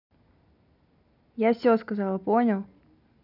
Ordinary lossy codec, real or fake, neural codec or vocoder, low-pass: none; real; none; 5.4 kHz